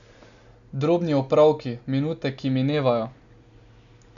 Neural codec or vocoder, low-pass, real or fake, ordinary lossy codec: none; 7.2 kHz; real; none